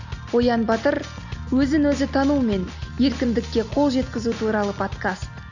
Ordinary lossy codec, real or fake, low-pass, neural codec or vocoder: none; real; 7.2 kHz; none